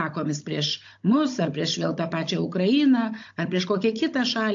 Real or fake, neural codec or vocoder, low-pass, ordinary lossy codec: fake; codec, 16 kHz, 16 kbps, FunCodec, trained on Chinese and English, 50 frames a second; 7.2 kHz; AAC, 48 kbps